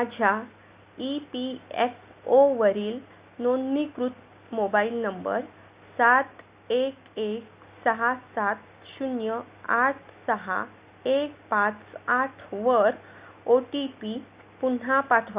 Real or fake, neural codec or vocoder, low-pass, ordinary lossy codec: real; none; 3.6 kHz; Opus, 64 kbps